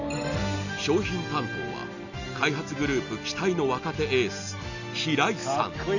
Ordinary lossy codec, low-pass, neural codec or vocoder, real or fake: none; 7.2 kHz; none; real